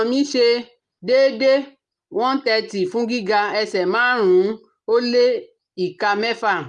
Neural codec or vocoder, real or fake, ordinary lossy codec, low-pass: none; real; Opus, 32 kbps; 10.8 kHz